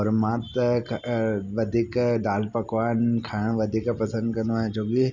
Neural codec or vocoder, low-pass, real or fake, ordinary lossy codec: none; 7.2 kHz; real; none